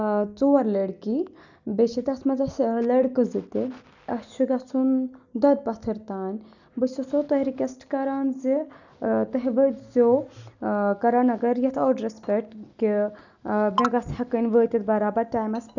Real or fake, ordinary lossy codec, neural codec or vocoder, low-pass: real; none; none; 7.2 kHz